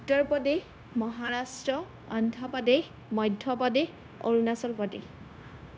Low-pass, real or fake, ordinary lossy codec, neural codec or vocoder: none; fake; none; codec, 16 kHz, 0.9 kbps, LongCat-Audio-Codec